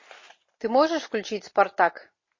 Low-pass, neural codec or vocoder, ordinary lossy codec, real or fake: 7.2 kHz; none; MP3, 32 kbps; real